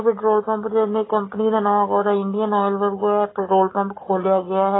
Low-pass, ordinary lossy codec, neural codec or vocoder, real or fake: 7.2 kHz; AAC, 16 kbps; none; real